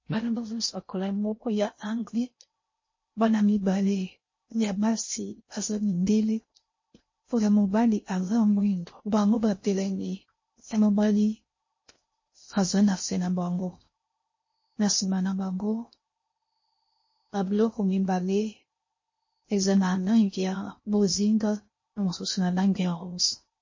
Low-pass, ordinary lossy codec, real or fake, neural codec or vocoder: 7.2 kHz; MP3, 32 kbps; fake; codec, 16 kHz in and 24 kHz out, 0.6 kbps, FocalCodec, streaming, 4096 codes